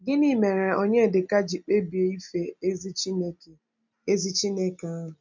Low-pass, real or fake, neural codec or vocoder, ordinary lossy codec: 7.2 kHz; real; none; MP3, 64 kbps